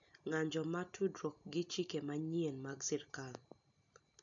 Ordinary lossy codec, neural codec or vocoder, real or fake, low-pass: none; none; real; 7.2 kHz